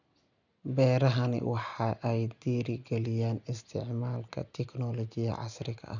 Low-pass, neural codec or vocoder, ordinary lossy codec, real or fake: 7.2 kHz; none; none; real